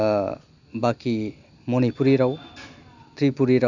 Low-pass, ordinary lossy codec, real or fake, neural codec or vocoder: 7.2 kHz; none; real; none